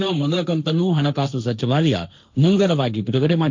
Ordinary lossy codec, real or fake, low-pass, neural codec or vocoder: MP3, 64 kbps; fake; 7.2 kHz; codec, 16 kHz, 1.1 kbps, Voila-Tokenizer